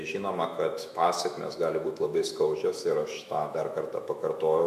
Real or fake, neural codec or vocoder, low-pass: fake; autoencoder, 48 kHz, 128 numbers a frame, DAC-VAE, trained on Japanese speech; 14.4 kHz